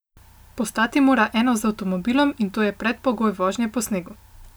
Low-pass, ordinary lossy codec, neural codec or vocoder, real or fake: none; none; none; real